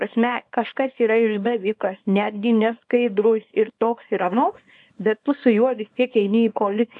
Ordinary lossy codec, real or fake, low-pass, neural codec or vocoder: MP3, 64 kbps; fake; 10.8 kHz; codec, 24 kHz, 0.9 kbps, WavTokenizer, small release